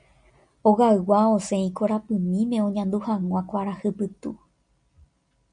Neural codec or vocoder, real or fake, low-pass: none; real; 9.9 kHz